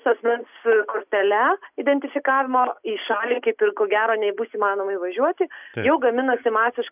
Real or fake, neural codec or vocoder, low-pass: real; none; 3.6 kHz